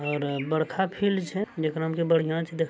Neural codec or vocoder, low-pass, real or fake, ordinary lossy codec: none; none; real; none